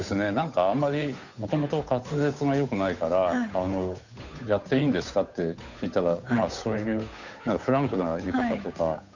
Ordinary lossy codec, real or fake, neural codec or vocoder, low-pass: none; fake; codec, 16 kHz, 8 kbps, FunCodec, trained on Chinese and English, 25 frames a second; 7.2 kHz